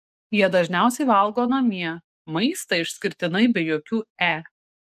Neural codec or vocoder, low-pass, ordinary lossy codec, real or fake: codec, 44.1 kHz, 7.8 kbps, DAC; 14.4 kHz; MP3, 96 kbps; fake